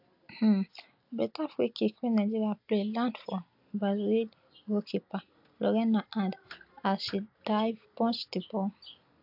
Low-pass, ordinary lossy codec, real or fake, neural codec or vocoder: 5.4 kHz; AAC, 48 kbps; real; none